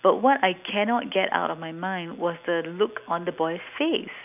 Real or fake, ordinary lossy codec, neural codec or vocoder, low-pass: real; none; none; 3.6 kHz